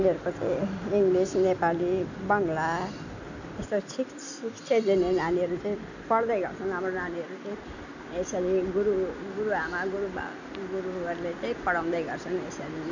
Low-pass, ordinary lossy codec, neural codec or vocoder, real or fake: 7.2 kHz; none; none; real